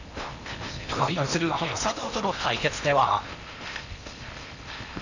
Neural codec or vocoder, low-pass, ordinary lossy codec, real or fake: codec, 16 kHz in and 24 kHz out, 0.8 kbps, FocalCodec, streaming, 65536 codes; 7.2 kHz; none; fake